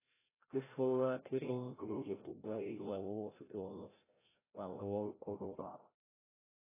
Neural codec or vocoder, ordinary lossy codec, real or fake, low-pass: codec, 16 kHz, 0.5 kbps, FreqCodec, larger model; AAC, 16 kbps; fake; 3.6 kHz